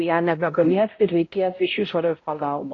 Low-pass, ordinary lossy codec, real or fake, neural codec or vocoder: 7.2 kHz; AAC, 32 kbps; fake; codec, 16 kHz, 0.5 kbps, X-Codec, HuBERT features, trained on balanced general audio